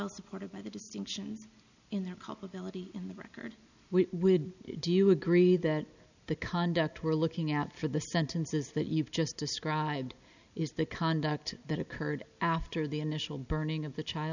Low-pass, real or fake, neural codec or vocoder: 7.2 kHz; real; none